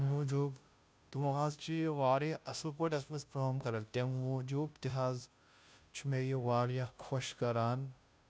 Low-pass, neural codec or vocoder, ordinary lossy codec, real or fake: none; codec, 16 kHz, 0.5 kbps, FunCodec, trained on Chinese and English, 25 frames a second; none; fake